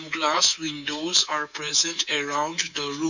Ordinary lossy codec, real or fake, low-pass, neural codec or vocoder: none; fake; 7.2 kHz; codec, 24 kHz, 3.1 kbps, DualCodec